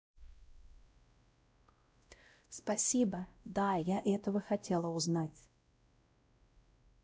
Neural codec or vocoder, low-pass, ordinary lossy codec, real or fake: codec, 16 kHz, 0.5 kbps, X-Codec, WavLM features, trained on Multilingual LibriSpeech; none; none; fake